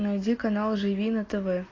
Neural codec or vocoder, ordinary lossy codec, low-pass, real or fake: none; AAC, 32 kbps; 7.2 kHz; real